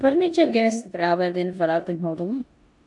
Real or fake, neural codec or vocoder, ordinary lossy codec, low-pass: fake; codec, 16 kHz in and 24 kHz out, 0.9 kbps, LongCat-Audio-Codec, four codebook decoder; AAC, 64 kbps; 10.8 kHz